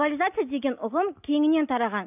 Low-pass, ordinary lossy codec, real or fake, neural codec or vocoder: 3.6 kHz; none; real; none